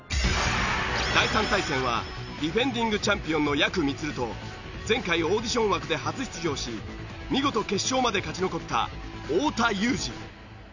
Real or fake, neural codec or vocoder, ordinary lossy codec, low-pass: real; none; none; 7.2 kHz